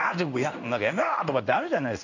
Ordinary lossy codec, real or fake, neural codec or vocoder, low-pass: none; fake; codec, 16 kHz in and 24 kHz out, 1 kbps, XY-Tokenizer; 7.2 kHz